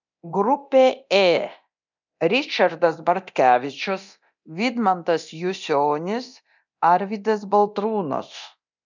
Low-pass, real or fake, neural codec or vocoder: 7.2 kHz; fake; codec, 24 kHz, 0.9 kbps, DualCodec